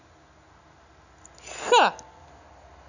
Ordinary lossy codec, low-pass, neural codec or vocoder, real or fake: none; 7.2 kHz; none; real